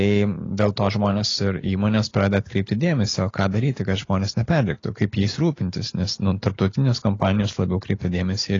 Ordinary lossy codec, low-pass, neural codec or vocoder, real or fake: AAC, 32 kbps; 7.2 kHz; none; real